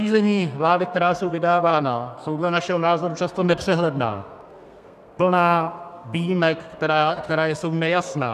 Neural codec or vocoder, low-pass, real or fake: codec, 32 kHz, 1.9 kbps, SNAC; 14.4 kHz; fake